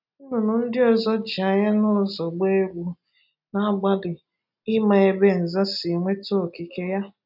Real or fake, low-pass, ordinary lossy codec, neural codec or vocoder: real; 5.4 kHz; none; none